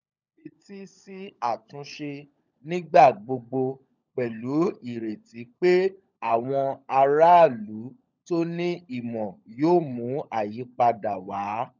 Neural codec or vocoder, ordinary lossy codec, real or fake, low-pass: codec, 16 kHz, 16 kbps, FunCodec, trained on LibriTTS, 50 frames a second; none; fake; 7.2 kHz